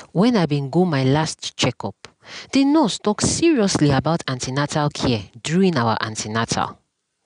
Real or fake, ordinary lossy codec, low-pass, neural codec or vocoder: real; none; 9.9 kHz; none